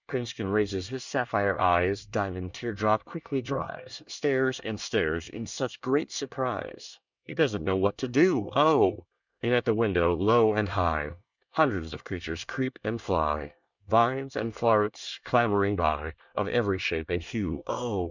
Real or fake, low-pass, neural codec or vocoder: fake; 7.2 kHz; codec, 24 kHz, 1 kbps, SNAC